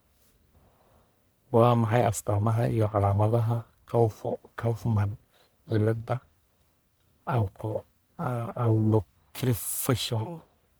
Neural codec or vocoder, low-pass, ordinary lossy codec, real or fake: codec, 44.1 kHz, 1.7 kbps, Pupu-Codec; none; none; fake